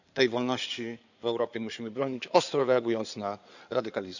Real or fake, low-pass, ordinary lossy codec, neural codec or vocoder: fake; 7.2 kHz; none; codec, 16 kHz in and 24 kHz out, 2.2 kbps, FireRedTTS-2 codec